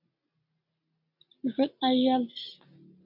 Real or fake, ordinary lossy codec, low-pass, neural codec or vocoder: real; AAC, 48 kbps; 5.4 kHz; none